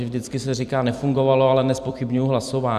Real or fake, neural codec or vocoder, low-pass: fake; vocoder, 44.1 kHz, 128 mel bands every 256 samples, BigVGAN v2; 14.4 kHz